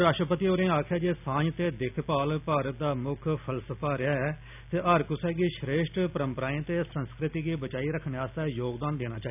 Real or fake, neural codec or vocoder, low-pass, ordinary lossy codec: real; none; 3.6 kHz; none